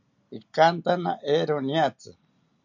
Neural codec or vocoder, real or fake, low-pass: none; real; 7.2 kHz